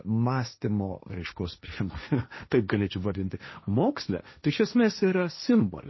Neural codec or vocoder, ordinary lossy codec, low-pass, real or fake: codec, 16 kHz, 1.1 kbps, Voila-Tokenizer; MP3, 24 kbps; 7.2 kHz; fake